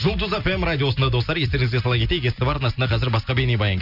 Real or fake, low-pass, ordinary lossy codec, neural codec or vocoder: real; 5.4 kHz; none; none